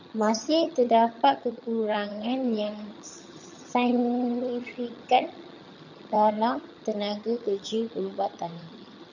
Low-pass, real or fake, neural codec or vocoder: 7.2 kHz; fake; vocoder, 22.05 kHz, 80 mel bands, HiFi-GAN